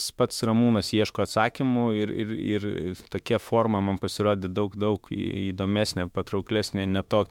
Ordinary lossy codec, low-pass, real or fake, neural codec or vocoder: MP3, 96 kbps; 19.8 kHz; fake; autoencoder, 48 kHz, 32 numbers a frame, DAC-VAE, trained on Japanese speech